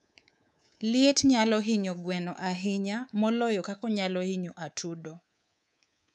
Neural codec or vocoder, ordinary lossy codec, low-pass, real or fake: codec, 24 kHz, 3.1 kbps, DualCodec; none; none; fake